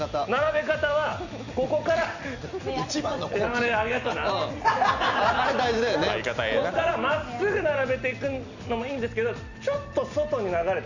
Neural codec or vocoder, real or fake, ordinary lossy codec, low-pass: none; real; none; 7.2 kHz